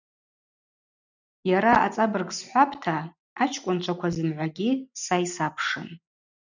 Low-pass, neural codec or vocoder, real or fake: 7.2 kHz; none; real